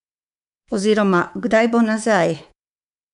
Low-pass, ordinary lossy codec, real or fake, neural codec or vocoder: 10.8 kHz; none; fake; codec, 24 kHz, 3.1 kbps, DualCodec